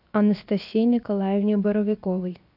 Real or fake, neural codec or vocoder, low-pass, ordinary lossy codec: fake; codec, 16 kHz, 0.7 kbps, FocalCodec; 5.4 kHz; AAC, 48 kbps